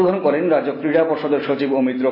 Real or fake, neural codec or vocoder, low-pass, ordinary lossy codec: real; none; 5.4 kHz; MP3, 24 kbps